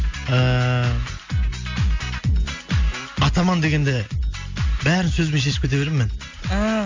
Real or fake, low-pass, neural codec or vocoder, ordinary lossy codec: real; 7.2 kHz; none; MP3, 48 kbps